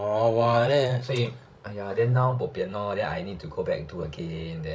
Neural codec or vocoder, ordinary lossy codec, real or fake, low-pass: codec, 16 kHz, 16 kbps, FreqCodec, larger model; none; fake; none